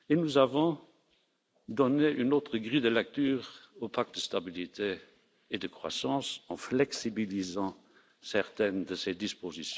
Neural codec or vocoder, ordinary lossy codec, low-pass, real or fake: none; none; none; real